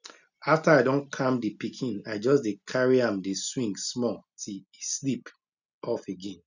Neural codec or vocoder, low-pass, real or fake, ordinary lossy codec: none; 7.2 kHz; real; none